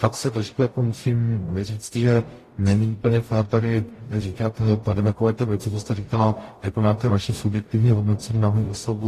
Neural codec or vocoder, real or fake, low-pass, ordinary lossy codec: codec, 44.1 kHz, 0.9 kbps, DAC; fake; 14.4 kHz; AAC, 48 kbps